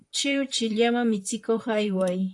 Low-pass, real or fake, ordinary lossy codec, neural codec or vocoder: 10.8 kHz; fake; AAC, 64 kbps; vocoder, 44.1 kHz, 128 mel bands, Pupu-Vocoder